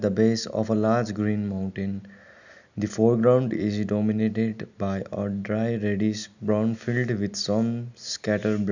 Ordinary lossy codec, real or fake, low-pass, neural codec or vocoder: none; real; 7.2 kHz; none